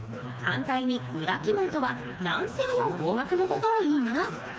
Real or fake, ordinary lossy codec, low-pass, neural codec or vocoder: fake; none; none; codec, 16 kHz, 2 kbps, FreqCodec, smaller model